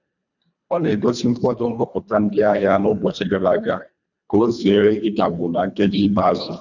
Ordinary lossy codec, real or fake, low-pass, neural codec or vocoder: none; fake; 7.2 kHz; codec, 24 kHz, 1.5 kbps, HILCodec